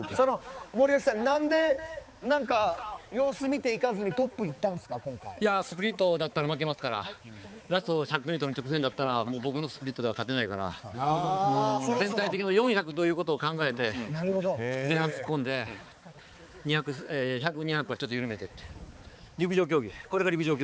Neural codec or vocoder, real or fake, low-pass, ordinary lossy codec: codec, 16 kHz, 4 kbps, X-Codec, HuBERT features, trained on balanced general audio; fake; none; none